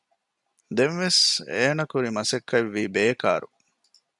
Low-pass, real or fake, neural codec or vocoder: 10.8 kHz; real; none